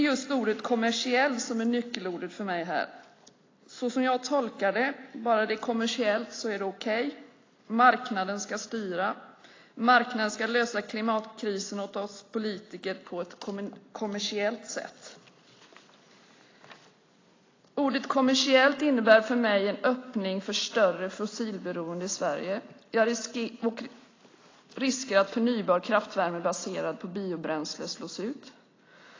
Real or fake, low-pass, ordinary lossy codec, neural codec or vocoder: real; 7.2 kHz; AAC, 32 kbps; none